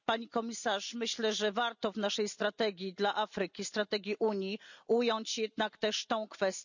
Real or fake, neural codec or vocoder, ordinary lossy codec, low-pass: real; none; none; 7.2 kHz